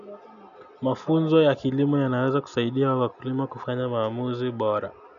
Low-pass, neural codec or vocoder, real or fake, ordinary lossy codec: 7.2 kHz; none; real; none